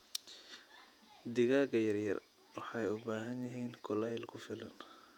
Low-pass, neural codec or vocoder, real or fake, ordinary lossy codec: 19.8 kHz; vocoder, 48 kHz, 128 mel bands, Vocos; fake; none